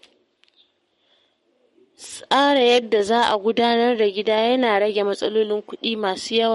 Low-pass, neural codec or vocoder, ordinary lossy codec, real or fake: 19.8 kHz; codec, 44.1 kHz, 7.8 kbps, Pupu-Codec; MP3, 48 kbps; fake